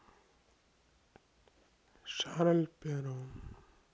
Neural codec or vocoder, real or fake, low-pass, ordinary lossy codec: none; real; none; none